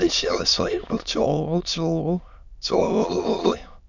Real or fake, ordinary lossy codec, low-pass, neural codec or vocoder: fake; none; 7.2 kHz; autoencoder, 22.05 kHz, a latent of 192 numbers a frame, VITS, trained on many speakers